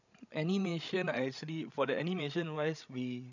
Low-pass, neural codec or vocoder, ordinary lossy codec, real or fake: 7.2 kHz; codec, 16 kHz, 16 kbps, FreqCodec, larger model; none; fake